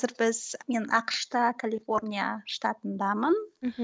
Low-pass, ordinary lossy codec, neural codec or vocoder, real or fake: none; none; none; real